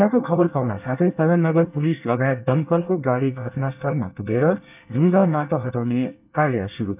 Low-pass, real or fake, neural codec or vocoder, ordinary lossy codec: 3.6 kHz; fake; codec, 24 kHz, 1 kbps, SNAC; none